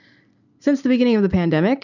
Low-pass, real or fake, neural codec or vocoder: 7.2 kHz; real; none